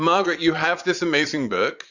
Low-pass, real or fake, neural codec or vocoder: 7.2 kHz; fake; vocoder, 22.05 kHz, 80 mel bands, Vocos